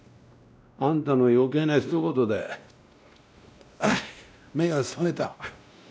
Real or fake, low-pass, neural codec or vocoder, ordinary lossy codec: fake; none; codec, 16 kHz, 1 kbps, X-Codec, WavLM features, trained on Multilingual LibriSpeech; none